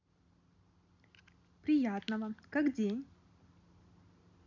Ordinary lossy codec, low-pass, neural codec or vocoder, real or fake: none; 7.2 kHz; none; real